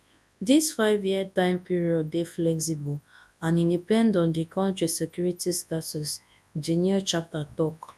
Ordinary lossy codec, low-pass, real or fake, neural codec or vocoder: none; none; fake; codec, 24 kHz, 0.9 kbps, WavTokenizer, large speech release